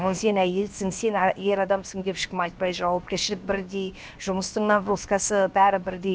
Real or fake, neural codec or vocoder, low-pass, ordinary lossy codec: fake; codec, 16 kHz, 0.7 kbps, FocalCodec; none; none